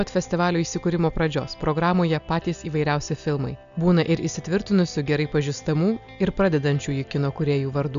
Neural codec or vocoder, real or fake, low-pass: none; real; 7.2 kHz